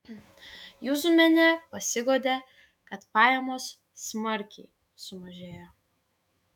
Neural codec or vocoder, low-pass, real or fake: autoencoder, 48 kHz, 128 numbers a frame, DAC-VAE, trained on Japanese speech; 19.8 kHz; fake